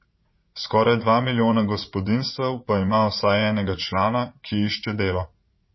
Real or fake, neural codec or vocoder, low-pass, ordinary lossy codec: fake; vocoder, 44.1 kHz, 80 mel bands, Vocos; 7.2 kHz; MP3, 24 kbps